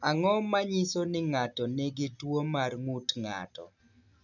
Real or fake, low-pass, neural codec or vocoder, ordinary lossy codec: real; 7.2 kHz; none; none